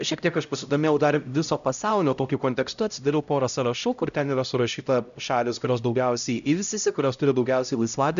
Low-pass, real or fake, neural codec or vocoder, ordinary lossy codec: 7.2 kHz; fake; codec, 16 kHz, 0.5 kbps, X-Codec, HuBERT features, trained on LibriSpeech; MP3, 96 kbps